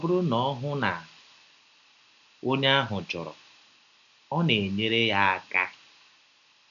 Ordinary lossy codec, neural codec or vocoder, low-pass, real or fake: none; none; 7.2 kHz; real